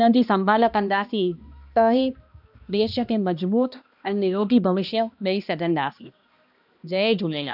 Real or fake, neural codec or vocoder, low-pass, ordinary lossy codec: fake; codec, 16 kHz, 1 kbps, X-Codec, HuBERT features, trained on balanced general audio; 5.4 kHz; none